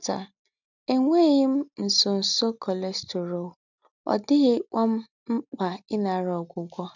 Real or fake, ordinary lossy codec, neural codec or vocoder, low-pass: real; none; none; 7.2 kHz